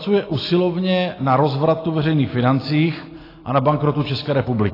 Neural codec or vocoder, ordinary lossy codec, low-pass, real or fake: none; AAC, 24 kbps; 5.4 kHz; real